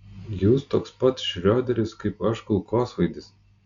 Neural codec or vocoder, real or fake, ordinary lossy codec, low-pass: none; real; Opus, 64 kbps; 7.2 kHz